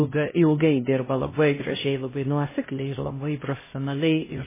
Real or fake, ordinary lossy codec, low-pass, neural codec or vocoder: fake; MP3, 16 kbps; 3.6 kHz; codec, 16 kHz, 0.5 kbps, X-Codec, HuBERT features, trained on LibriSpeech